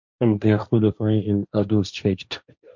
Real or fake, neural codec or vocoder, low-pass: fake; codec, 16 kHz, 1.1 kbps, Voila-Tokenizer; 7.2 kHz